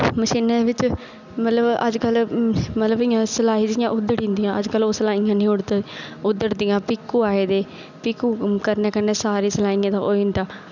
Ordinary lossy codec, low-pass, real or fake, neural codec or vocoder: none; 7.2 kHz; real; none